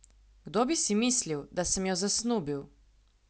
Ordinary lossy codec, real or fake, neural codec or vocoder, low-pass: none; real; none; none